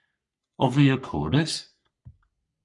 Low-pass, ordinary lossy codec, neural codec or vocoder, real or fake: 10.8 kHz; MP3, 96 kbps; codec, 44.1 kHz, 3.4 kbps, Pupu-Codec; fake